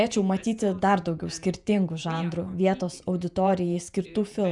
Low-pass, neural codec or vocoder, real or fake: 10.8 kHz; vocoder, 48 kHz, 128 mel bands, Vocos; fake